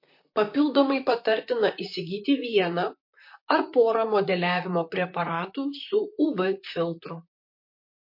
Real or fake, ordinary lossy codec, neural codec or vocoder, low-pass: fake; MP3, 32 kbps; vocoder, 44.1 kHz, 128 mel bands, Pupu-Vocoder; 5.4 kHz